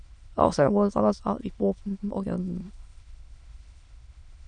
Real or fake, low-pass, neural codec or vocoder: fake; 9.9 kHz; autoencoder, 22.05 kHz, a latent of 192 numbers a frame, VITS, trained on many speakers